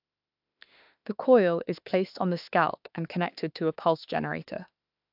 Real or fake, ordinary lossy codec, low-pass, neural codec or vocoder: fake; AAC, 48 kbps; 5.4 kHz; autoencoder, 48 kHz, 32 numbers a frame, DAC-VAE, trained on Japanese speech